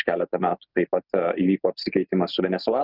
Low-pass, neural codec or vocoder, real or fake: 5.4 kHz; none; real